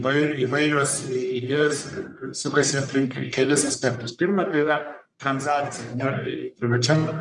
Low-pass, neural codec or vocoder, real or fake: 10.8 kHz; codec, 44.1 kHz, 1.7 kbps, Pupu-Codec; fake